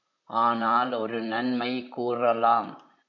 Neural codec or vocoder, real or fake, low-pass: vocoder, 44.1 kHz, 128 mel bands, Pupu-Vocoder; fake; 7.2 kHz